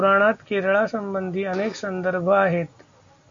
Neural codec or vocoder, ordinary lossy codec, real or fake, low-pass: none; MP3, 64 kbps; real; 7.2 kHz